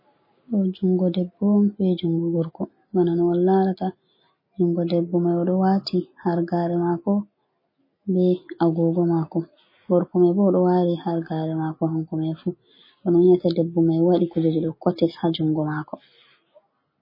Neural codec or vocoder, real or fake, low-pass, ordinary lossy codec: none; real; 5.4 kHz; MP3, 24 kbps